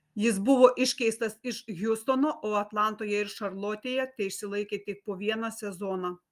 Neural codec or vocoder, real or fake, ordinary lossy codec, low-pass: none; real; Opus, 32 kbps; 14.4 kHz